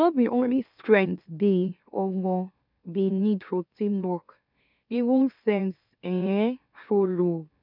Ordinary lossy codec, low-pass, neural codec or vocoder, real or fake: none; 5.4 kHz; autoencoder, 44.1 kHz, a latent of 192 numbers a frame, MeloTTS; fake